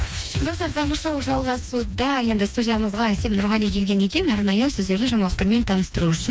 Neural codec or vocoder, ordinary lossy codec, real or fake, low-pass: codec, 16 kHz, 2 kbps, FreqCodec, smaller model; none; fake; none